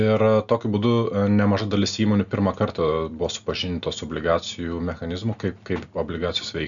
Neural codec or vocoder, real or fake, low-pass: none; real; 7.2 kHz